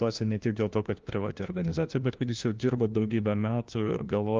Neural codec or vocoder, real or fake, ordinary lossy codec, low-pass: codec, 16 kHz, 1 kbps, FunCodec, trained on LibriTTS, 50 frames a second; fake; Opus, 32 kbps; 7.2 kHz